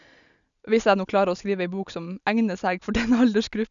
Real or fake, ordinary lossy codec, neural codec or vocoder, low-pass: real; none; none; 7.2 kHz